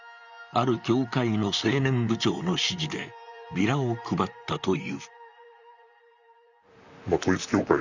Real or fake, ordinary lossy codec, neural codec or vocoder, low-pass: fake; none; vocoder, 44.1 kHz, 128 mel bands, Pupu-Vocoder; 7.2 kHz